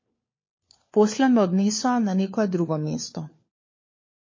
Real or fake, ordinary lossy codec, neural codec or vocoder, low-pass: fake; MP3, 32 kbps; codec, 16 kHz, 4 kbps, FunCodec, trained on LibriTTS, 50 frames a second; 7.2 kHz